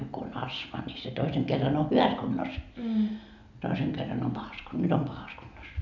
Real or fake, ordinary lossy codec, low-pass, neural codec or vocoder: real; none; 7.2 kHz; none